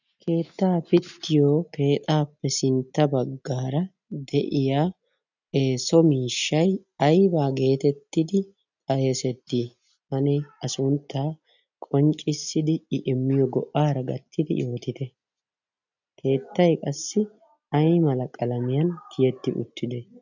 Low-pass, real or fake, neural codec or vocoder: 7.2 kHz; real; none